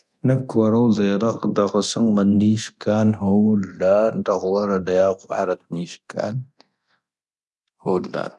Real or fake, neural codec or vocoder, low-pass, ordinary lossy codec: fake; codec, 24 kHz, 0.9 kbps, DualCodec; none; none